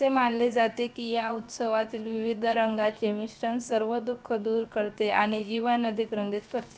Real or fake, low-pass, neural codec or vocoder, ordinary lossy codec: fake; none; codec, 16 kHz, 0.7 kbps, FocalCodec; none